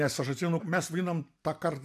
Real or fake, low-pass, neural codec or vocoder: real; 14.4 kHz; none